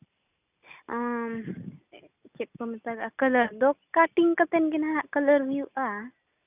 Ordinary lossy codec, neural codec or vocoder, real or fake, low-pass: none; none; real; 3.6 kHz